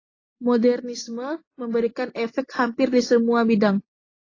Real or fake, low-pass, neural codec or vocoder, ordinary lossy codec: real; 7.2 kHz; none; AAC, 32 kbps